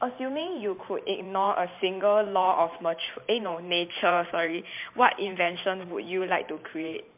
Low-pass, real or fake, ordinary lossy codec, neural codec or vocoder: 3.6 kHz; fake; MP3, 32 kbps; vocoder, 44.1 kHz, 128 mel bands every 512 samples, BigVGAN v2